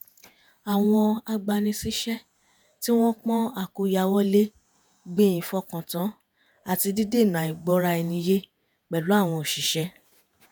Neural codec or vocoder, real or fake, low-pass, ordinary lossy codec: vocoder, 48 kHz, 128 mel bands, Vocos; fake; none; none